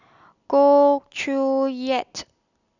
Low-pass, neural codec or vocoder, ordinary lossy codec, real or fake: 7.2 kHz; none; none; real